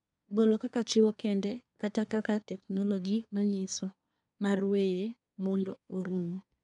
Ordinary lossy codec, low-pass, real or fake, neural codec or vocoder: none; 10.8 kHz; fake; codec, 24 kHz, 1 kbps, SNAC